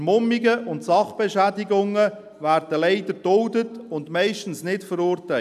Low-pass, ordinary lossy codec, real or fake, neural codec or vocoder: 14.4 kHz; none; real; none